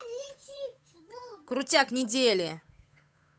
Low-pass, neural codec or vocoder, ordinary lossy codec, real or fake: none; codec, 16 kHz, 8 kbps, FunCodec, trained on Chinese and English, 25 frames a second; none; fake